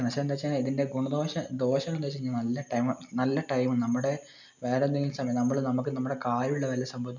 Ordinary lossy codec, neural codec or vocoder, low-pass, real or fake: none; none; 7.2 kHz; real